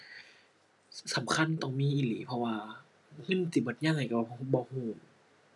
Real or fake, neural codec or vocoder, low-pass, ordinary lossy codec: real; none; 10.8 kHz; none